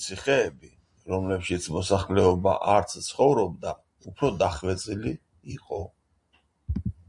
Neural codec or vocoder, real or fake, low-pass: none; real; 10.8 kHz